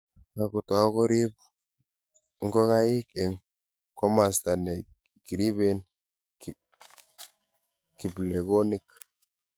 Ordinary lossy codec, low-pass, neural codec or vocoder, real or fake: none; none; codec, 44.1 kHz, 7.8 kbps, DAC; fake